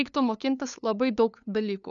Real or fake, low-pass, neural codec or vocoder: fake; 7.2 kHz; codec, 16 kHz, 2 kbps, FunCodec, trained on LibriTTS, 25 frames a second